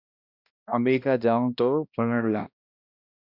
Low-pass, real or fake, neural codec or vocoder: 5.4 kHz; fake; codec, 16 kHz, 1 kbps, X-Codec, HuBERT features, trained on balanced general audio